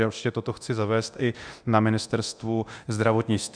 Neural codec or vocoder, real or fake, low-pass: codec, 24 kHz, 0.9 kbps, DualCodec; fake; 9.9 kHz